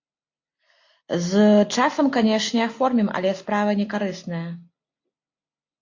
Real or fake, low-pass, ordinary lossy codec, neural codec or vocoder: real; 7.2 kHz; AAC, 48 kbps; none